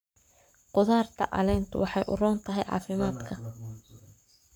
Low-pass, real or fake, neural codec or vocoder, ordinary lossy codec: none; fake; codec, 44.1 kHz, 7.8 kbps, Pupu-Codec; none